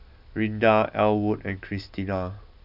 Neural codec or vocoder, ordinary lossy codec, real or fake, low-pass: none; none; real; 5.4 kHz